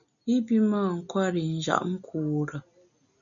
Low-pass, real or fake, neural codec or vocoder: 7.2 kHz; real; none